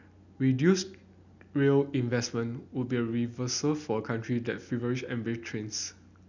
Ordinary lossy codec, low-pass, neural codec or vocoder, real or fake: none; 7.2 kHz; none; real